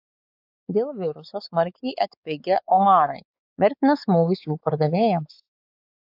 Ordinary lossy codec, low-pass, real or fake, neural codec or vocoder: AAC, 48 kbps; 5.4 kHz; fake; codec, 16 kHz, 4 kbps, X-Codec, WavLM features, trained on Multilingual LibriSpeech